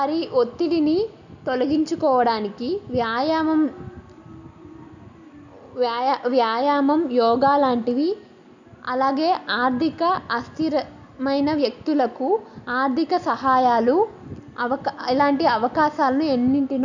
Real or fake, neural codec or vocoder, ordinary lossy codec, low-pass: real; none; none; 7.2 kHz